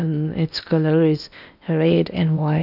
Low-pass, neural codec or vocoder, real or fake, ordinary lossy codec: 5.4 kHz; codec, 16 kHz in and 24 kHz out, 0.8 kbps, FocalCodec, streaming, 65536 codes; fake; none